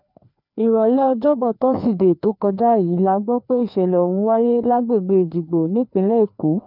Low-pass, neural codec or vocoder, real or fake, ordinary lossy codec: 5.4 kHz; codec, 16 kHz, 2 kbps, FreqCodec, larger model; fake; none